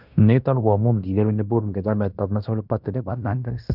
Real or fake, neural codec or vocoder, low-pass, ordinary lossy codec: fake; codec, 16 kHz in and 24 kHz out, 0.9 kbps, LongCat-Audio-Codec, fine tuned four codebook decoder; 5.4 kHz; none